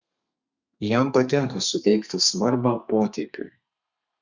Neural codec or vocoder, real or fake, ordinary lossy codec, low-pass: codec, 32 kHz, 1.9 kbps, SNAC; fake; Opus, 64 kbps; 7.2 kHz